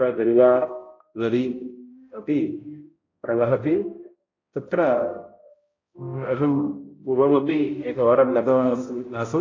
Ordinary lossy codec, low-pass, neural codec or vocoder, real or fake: AAC, 32 kbps; 7.2 kHz; codec, 16 kHz, 0.5 kbps, X-Codec, HuBERT features, trained on balanced general audio; fake